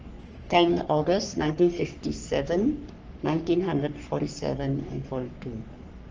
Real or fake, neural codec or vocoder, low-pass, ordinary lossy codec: fake; codec, 44.1 kHz, 3.4 kbps, Pupu-Codec; 7.2 kHz; Opus, 24 kbps